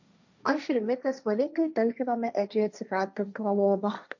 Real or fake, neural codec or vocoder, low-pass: fake; codec, 16 kHz, 1.1 kbps, Voila-Tokenizer; 7.2 kHz